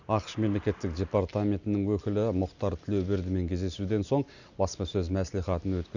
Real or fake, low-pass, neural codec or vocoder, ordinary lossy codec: real; 7.2 kHz; none; none